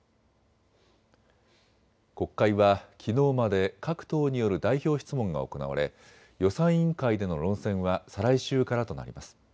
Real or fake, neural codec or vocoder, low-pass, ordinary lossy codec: real; none; none; none